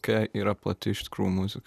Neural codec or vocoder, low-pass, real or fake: vocoder, 44.1 kHz, 128 mel bands every 256 samples, BigVGAN v2; 14.4 kHz; fake